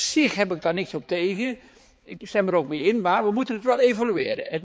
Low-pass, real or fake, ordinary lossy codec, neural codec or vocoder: none; fake; none; codec, 16 kHz, 4 kbps, X-Codec, HuBERT features, trained on balanced general audio